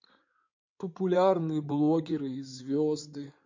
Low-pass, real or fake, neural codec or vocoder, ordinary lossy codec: 7.2 kHz; fake; codec, 16 kHz in and 24 kHz out, 2.2 kbps, FireRedTTS-2 codec; MP3, 48 kbps